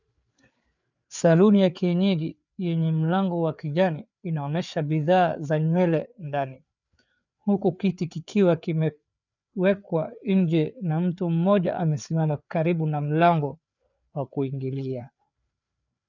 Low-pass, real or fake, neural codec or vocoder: 7.2 kHz; fake; codec, 16 kHz, 4 kbps, FreqCodec, larger model